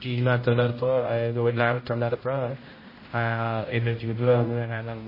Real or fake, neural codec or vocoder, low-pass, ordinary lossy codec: fake; codec, 16 kHz, 0.5 kbps, X-Codec, HuBERT features, trained on general audio; 5.4 kHz; MP3, 24 kbps